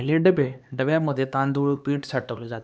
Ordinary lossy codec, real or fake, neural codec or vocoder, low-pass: none; fake; codec, 16 kHz, 2 kbps, X-Codec, HuBERT features, trained on LibriSpeech; none